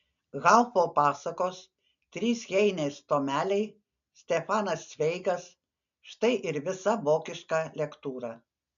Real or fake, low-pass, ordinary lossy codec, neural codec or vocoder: real; 7.2 kHz; MP3, 96 kbps; none